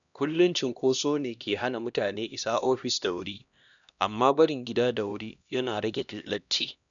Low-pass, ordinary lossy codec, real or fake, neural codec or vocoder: 7.2 kHz; none; fake; codec, 16 kHz, 1 kbps, X-Codec, HuBERT features, trained on LibriSpeech